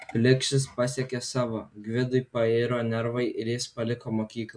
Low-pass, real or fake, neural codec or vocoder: 9.9 kHz; real; none